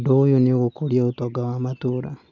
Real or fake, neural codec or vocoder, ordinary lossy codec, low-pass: real; none; none; 7.2 kHz